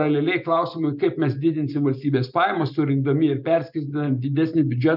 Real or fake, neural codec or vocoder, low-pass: real; none; 5.4 kHz